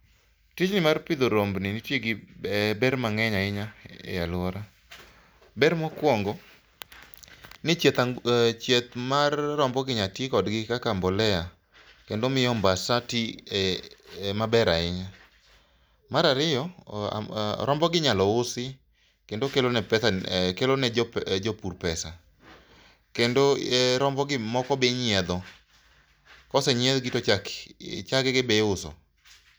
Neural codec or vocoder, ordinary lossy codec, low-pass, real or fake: none; none; none; real